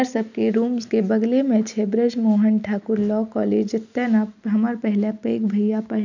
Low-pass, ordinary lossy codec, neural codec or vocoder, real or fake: 7.2 kHz; none; none; real